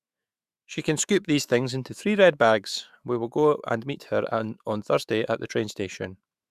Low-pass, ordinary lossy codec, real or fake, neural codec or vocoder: 14.4 kHz; Opus, 64 kbps; fake; autoencoder, 48 kHz, 128 numbers a frame, DAC-VAE, trained on Japanese speech